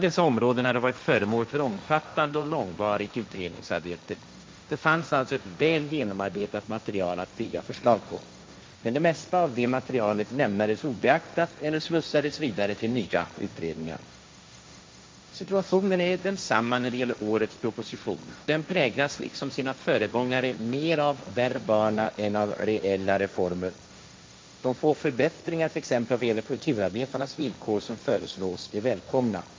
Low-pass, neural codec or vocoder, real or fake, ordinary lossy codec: none; codec, 16 kHz, 1.1 kbps, Voila-Tokenizer; fake; none